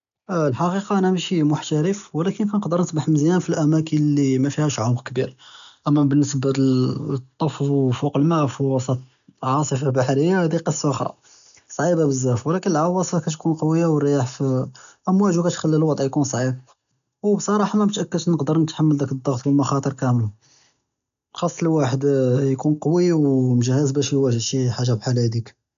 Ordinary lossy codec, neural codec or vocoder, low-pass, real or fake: none; none; 7.2 kHz; real